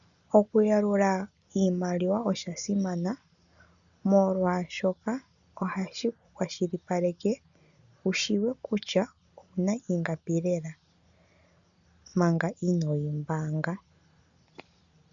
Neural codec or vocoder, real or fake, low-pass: none; real; 7.2 kHz